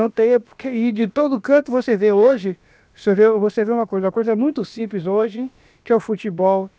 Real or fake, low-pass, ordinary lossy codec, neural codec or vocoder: fake; none; none; codec, 16 kHz, about 1 kbps, DyCAST, with the encoder's durations